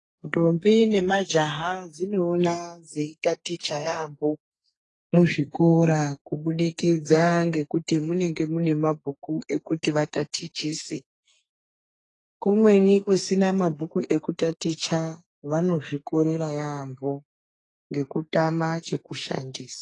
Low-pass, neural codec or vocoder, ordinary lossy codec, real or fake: 10.8 kHz; codec, 44.1 kHz, 2.6 kbps, SNAC; AAC, 32 kbps; fake